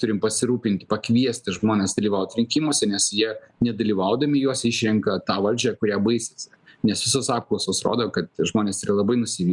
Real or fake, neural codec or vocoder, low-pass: real; none; 10.8 kHz